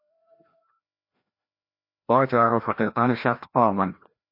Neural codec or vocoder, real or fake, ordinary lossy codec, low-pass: codec, 16 kHz, 1 kbps, FreqCodec, larger model; fake; MP3, 32 kbps; 5.4 kHz